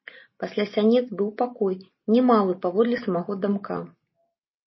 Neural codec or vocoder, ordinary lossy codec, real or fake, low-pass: none; MP3, 24 kbps; real; 7.2 kHz